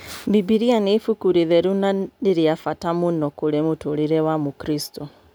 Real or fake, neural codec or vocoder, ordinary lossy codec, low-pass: real; none; none; none